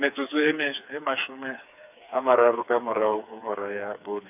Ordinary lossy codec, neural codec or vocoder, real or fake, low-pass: none; codec, 16 kHz, 4 kbps, FreqCodec, smaller model; fake; 3.6 kHz